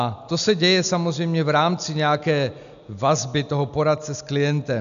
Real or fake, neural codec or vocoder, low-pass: real; none; 7.2 kHz